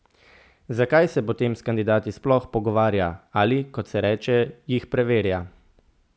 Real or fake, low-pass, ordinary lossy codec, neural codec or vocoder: real; none; none; none